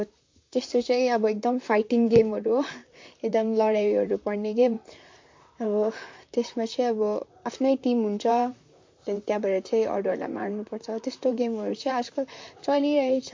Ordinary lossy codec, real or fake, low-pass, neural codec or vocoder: MP3, 48 kbps; fake; 7.2 kHz; vocoder, 44.1 kHz, 128 mel bands, Pupu-Vocoder